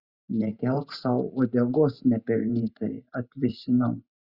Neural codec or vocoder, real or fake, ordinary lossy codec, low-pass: vocoder, 44.1 kHz, 128 mel bands every 512 samples, BigVGAN v2; fake; Opus, 64 kbps; 5.4 kHz